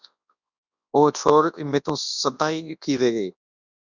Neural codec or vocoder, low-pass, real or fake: codec, 24 kHz, 0.9 kbps, WavTokenizer, large speech release; 7.2 kHz; fake